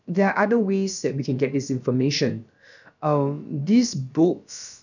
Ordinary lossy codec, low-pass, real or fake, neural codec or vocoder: none; 7.2 kHz; fake; codec, 16 kHz, about 1 kbps, DyCAST, with the encoder's durations